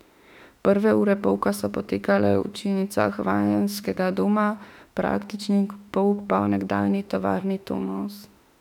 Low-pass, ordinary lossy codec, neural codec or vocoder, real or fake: 19.8 kHz; none; autoencoder, 48 kHz, 32 numbers a frame, DAC-VAE, trained on Japanese speech; fake